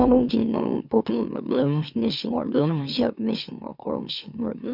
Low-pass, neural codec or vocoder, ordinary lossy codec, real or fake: 5.4 kHz; autoencoder, 44.1 kHz, a latent of 192 numbers a frame, MeloTTS; none; fake